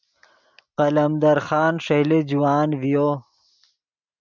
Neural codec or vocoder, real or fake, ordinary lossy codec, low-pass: none; real; MP3, 64 kbps; 7.2 kHz